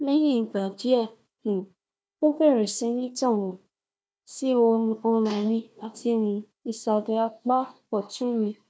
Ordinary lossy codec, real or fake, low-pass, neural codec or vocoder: none; fake; none; codec, 16 kHz, 1 kbps, FunCodec, trained on Chinese and English, 50 frames a second